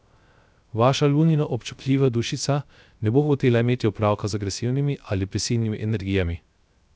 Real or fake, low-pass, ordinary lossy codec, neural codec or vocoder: fake; none; none; codec, 16 kHz, 0.3 kbps, FocalCodec